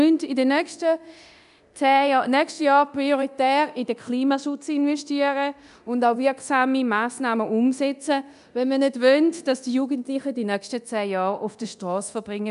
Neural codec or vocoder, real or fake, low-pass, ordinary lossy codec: codec, 24 kHz, 0.9 kbps, DualCodec; fake; 10.8 kHz; none